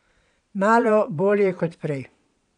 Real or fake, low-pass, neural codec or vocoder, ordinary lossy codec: fake; 9.9 kHz; vocoder, 22.05 kHz, 80 mel bands, Vocos; none